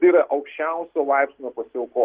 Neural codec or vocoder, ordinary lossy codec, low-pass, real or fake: none; Opus, 16 kbps; 3.6 kHz; real